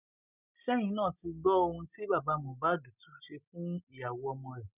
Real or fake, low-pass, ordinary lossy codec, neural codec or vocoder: real; 3.6 kHz; none; none